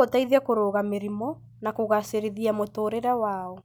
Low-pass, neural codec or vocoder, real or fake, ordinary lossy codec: none; none; real; none